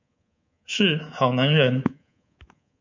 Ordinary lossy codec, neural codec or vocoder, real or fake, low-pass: MP3, 64 kbps; codec, 24 kHz, 3.1 kbps, DualCodec; fake; 7.2 kHz